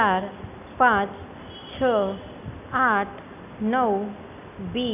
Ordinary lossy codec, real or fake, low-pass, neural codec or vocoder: none; real; 3.6 kHz; none